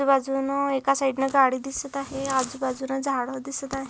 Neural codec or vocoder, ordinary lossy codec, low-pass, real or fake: none; none; none; real